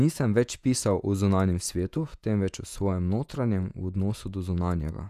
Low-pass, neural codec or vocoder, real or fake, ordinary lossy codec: 14.4 kHz; vocoder, 48 kHz, 128 mel bands, Vocos; fake; none